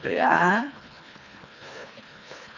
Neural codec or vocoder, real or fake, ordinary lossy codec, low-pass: codec, 24 kHz, 1.5 kbps, HILCodec; fake; none; 7.2 kHz